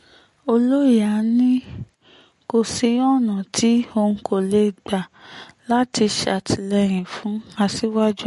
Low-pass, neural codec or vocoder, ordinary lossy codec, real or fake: 14.4 kHz; none; MP3, 48 kbps; real